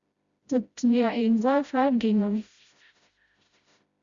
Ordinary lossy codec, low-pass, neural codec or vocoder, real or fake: Opus, 64 kbps; 7.2 kHz; codec, 16 kHz, 0.5 kbps, FreqCodec, smaller model; fake